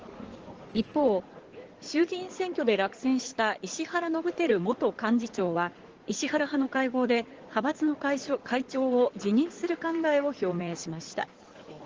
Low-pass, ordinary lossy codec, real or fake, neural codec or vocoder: 7.2 kHz; Opus, 16 kbps; fake; codec, 16 kHz in and 24 kHz out, 2.2 kbps, FireRedTTS-2 codec